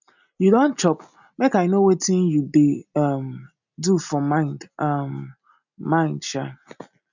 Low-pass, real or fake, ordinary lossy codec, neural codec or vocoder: 7.2 kHz; real; none; none